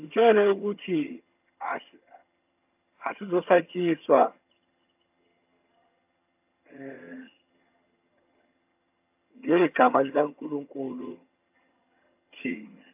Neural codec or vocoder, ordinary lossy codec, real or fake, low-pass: vocoder, 22.05 kHz, 80 mel bands, HiFi-GAN; AAC, 32 kbps; fake; 3.6 kHz